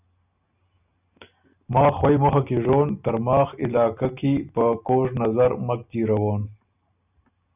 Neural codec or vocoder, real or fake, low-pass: none; real; 3.6 kHz